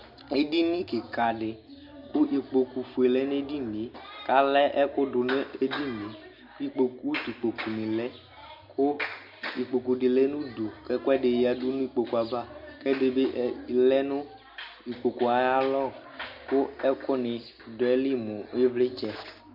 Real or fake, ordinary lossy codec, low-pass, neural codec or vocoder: real; MP3, 48 kbps; 5.4 kHz; none